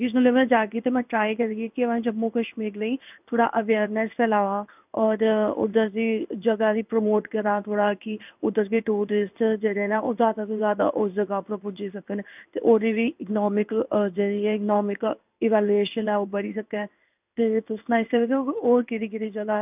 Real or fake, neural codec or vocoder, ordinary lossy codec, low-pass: fake; codec, 16 kHz in and 24 kHz out, 1 kbps, XY-Tokenizer; none; 3.6 kHz